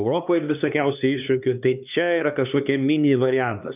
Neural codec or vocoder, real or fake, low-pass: codec, 16 kHz, 2 kbps, X-Codec, HuBERT features, trained on LibriSpeech; fake; 3.6 kHz